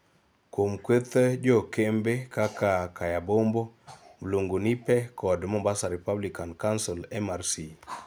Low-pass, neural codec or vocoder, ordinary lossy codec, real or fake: none; none; none; real